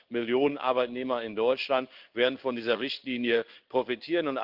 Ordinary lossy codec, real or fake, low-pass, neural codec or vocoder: Opus, 32 kbps; fake; 5.4 kHz; codec, 16 kHz in and 24 kHz out, 1 kbps, XY-Tokenizer